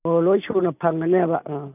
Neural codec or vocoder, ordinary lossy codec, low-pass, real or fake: none; none; 3.6 kHz; real